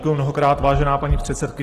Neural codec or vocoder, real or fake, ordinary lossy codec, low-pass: none; real; Opus, 16 kbps; 14.4 kHz